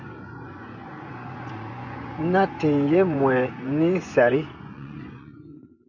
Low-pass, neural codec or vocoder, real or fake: 7.2 kHz; vocoder, 24 kHz, 100 mel bands, Vocos; fake